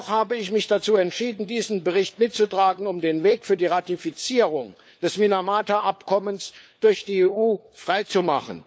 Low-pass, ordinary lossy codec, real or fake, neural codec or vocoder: none; none; fake; codec, 16 kHz, 4 kbps, FunCodec, trained on LibriTTS, 50 frames a second